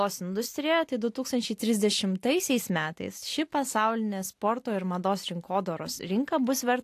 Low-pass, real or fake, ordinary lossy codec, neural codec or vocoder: 14.4 kHz; real; AAC, 64 kbps; none